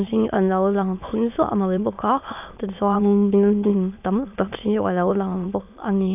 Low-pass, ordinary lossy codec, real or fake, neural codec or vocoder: 3.6 kHz; none; fake; autoencoder, 22.05 kHz, a latent of 192 numbers a frame, VITS, trained on many speakers